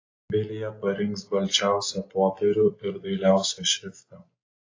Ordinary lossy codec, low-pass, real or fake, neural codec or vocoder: AAC, 32 kbps; 7.2 kHz; real; none